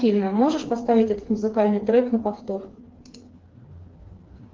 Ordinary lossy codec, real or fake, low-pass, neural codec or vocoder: Opus, 16 kbps; fake; 7.2 kHz; codec, 16 kHz, 4 kbps, FreqCodec, smaller model